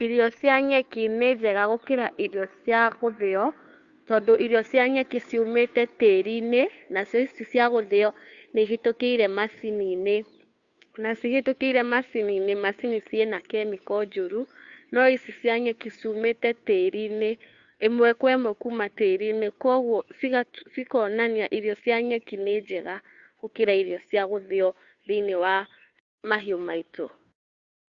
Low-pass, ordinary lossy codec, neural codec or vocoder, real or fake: 7.2 kHz; Opus, 64 kbps; codec, 16 kHz, 2 kbps, FunCodec, trained on Chinese and English, 25 frames a second; fake